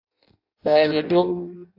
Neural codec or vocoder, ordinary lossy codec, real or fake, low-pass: codec, 16 kHz in and 24 kHz out, 0.6 kbps, FireRedTTS-2 codec; AAC, 32 kbps; fake; 5.4 kHz